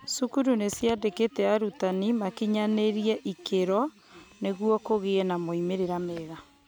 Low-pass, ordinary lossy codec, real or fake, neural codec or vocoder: none; none; real; none